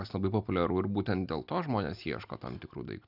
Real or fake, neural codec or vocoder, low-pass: real; none; 5.4 kHz